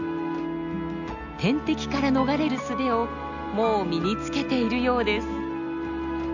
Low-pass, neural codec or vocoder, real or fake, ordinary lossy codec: 7.2 kHz; none; real; none